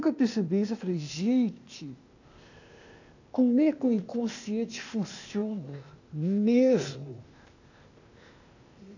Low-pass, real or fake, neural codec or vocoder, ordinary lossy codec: 7.2 kHz; fake; codec, 16 kHz, 0.8 kbps, ZipCodec; none